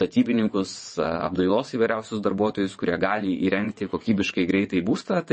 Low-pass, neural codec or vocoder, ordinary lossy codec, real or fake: 9.9 kHz; vocoder, 22.05 kHz, 80 mel bands, WaveNeXt; MP3, 32 kbps; fake